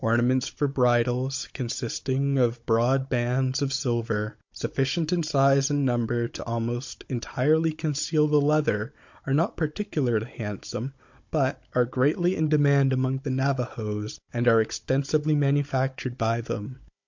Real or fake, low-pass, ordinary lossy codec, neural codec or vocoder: fake; 7.2 kHz; MP3, 48 kbps; codec, 16 kHz, 16 kbps, FunCodec, trained on Chinese and English, 50 frames a second